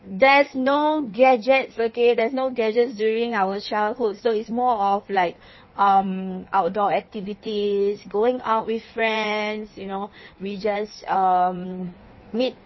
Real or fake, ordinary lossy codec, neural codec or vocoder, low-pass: fake; MP3, 24 kbps; codec, 16 kHz in and 24 kHz out, 1.1 kbps, FireRedTTS-2 codec; 7.2 kHz